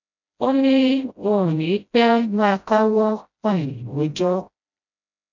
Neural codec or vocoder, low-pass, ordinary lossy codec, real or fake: codec, 16 kHz, 0.5 kbps, FreqCodec, smaller model; 7.2 kHz; none; fake